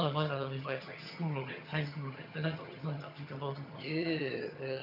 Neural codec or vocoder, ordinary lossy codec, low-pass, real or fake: vocoder, 22.05 kHz, 80 mel bands, HiFi-GAN; none; 5.4 kHz; fake